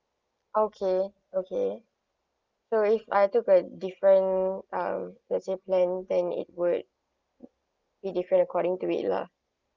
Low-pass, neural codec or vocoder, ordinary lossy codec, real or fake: 7.2 kHz; none; Opus, 24 kbps; real